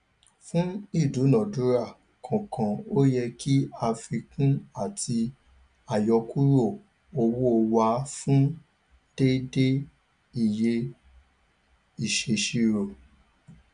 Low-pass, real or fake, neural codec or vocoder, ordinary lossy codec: 9.9 kHz; real; none; none